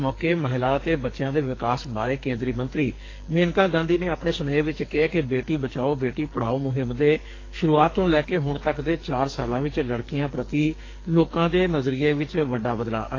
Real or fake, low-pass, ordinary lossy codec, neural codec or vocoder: fake; 7.2 kHz; AAC, 32 kbps; codec, 44.1 kHz, 2.6 kbps, SNAC